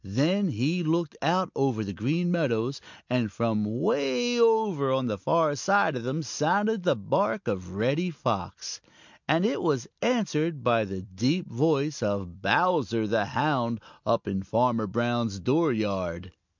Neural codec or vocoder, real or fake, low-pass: none; real; 7.2 kHz